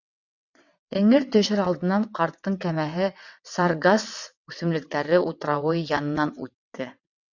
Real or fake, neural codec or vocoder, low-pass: fake; vocoder, 22.05 kHz, 80 mel bands, WaveNeXt; 7.2 kHz